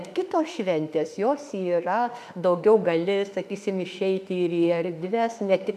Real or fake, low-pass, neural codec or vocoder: fake; 14.4 kHz; autoencoder, 48 kHz, 32 numbers a frame, DAC-VAE, trained on Japanese speech